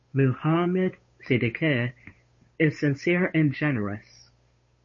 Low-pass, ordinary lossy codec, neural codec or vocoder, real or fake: 7.2 kHz; MP3, 32 kbps; codec, 16 kHz, 8 kbps, FunCodec, trained on Chinese and English, 25 frames a second; fake